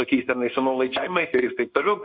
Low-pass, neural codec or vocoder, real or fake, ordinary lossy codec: 5.4 kHz; codec, 16 kHz in and 24 kHz out, 1 kbps, XY-Tokenizer; fake; MP3, 48 kbps